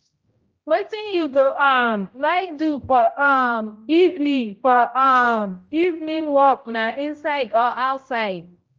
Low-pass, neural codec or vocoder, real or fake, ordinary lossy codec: 7.2 kHz; codec, 16 kHz, 0.5 kbps, X-Codec, HuBERT features, trained on general audio; fake; Opus, 24 kbps